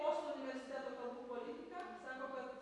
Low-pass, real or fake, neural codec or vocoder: 10.8 kHz; real; none